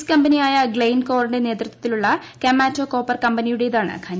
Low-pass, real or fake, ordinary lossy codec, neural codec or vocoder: none; real; none; none